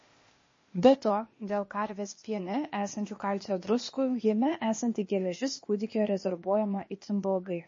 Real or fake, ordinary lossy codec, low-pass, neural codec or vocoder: fake; MP3, 32 kbps; 7.2 kHz; codec, 16 kHz, 0.8 kbps, ZipCodec